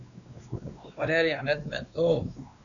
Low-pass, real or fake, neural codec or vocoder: 7.2 kHz; fake; codec, 16 kHz, 2 kbps, X-Codec, WavLM features, trained on Multilingual LibriSpeech